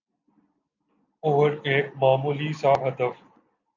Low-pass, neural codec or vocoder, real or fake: 7.2 kHz; none; real